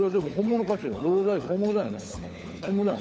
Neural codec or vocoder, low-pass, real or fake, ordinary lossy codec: codec, 16 kHz, 16 kbps, FunCodec, trained on LibriTTS, 50 frames a second; none; fake; none